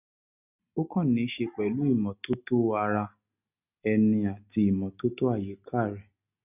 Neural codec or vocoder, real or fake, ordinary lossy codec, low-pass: none; real; none; 3.6 kHz